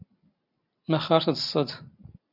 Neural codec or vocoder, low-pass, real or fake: none; 5.4 kHz; real